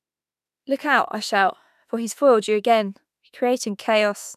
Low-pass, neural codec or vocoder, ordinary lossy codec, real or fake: 14.4 kHz; autoencoder, 48 kHz, 32 numbers a frame, DAC-VAE, trained on Japanese speech; none; fake